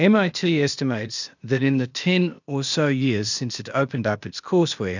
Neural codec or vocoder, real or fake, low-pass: codec, 16 kHz, 0.8 kbps, ZipCodec; fake; 7.2 kHz